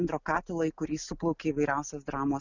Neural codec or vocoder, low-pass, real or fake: none; 7.2 kHz; real